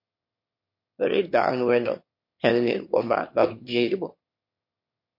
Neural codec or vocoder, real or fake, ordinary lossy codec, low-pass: autoencoder, 22.05 kHz, a latent of 192 numbers a frame, VITS, trained on one speaker; fake; MP3, 32 kbps; 5.4 kHz